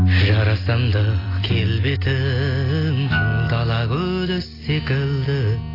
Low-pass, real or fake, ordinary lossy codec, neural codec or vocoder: 5.4 kHz; real; AAC, 24 kbps; none